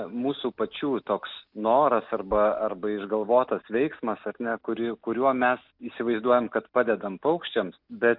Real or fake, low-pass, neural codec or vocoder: real; 5.4 kHz; none